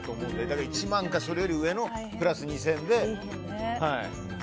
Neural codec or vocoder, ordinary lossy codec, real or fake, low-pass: none; none; real; none